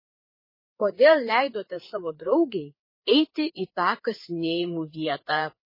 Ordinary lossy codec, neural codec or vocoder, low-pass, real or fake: MP3, 24 kbps; codec, 16 kHz, 4 kbps, FreqCodec, larger model; 5.4 kHz; fake